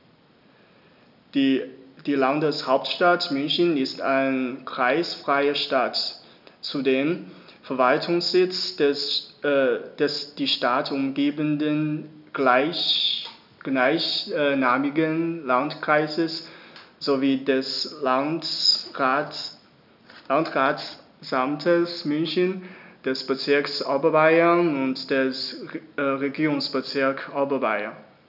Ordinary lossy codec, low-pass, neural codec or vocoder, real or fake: none; 5.4 kHz; none; real